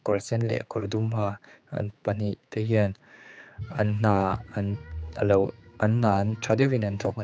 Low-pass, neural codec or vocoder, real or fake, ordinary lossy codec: none; codec, 16 kHz, 4 kbps, X-Codec, HuBERT features, trained on general audio; fake; none